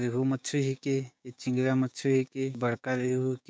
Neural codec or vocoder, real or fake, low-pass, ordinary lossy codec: codec, 16 kHz, 6 kbps, DAC; fake; none; none